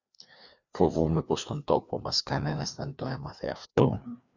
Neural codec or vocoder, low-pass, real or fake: codec, 16 kHz, 2 kbps, FreqCodec, larger model; 7.2 kHz; fake